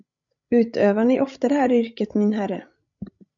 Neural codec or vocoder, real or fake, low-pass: codec, 16 kHz, 16 kbps, FreqCodec, larger model; fake; 7.2 kHz